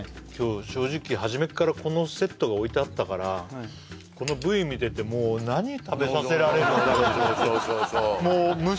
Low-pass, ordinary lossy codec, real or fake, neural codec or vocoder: none; none; real; none